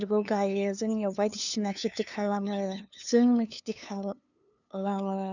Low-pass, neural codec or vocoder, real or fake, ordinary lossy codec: 7.2 kHz; codec, 16 kHz, 2 kbps, FunCodec, trained on LibriTTS, 25 frames a second; fake; none